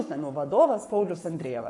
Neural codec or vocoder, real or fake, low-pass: codec, 44.1 kHz, 7.8 kbps, Pupu-Codec; fake; 10.8 kHz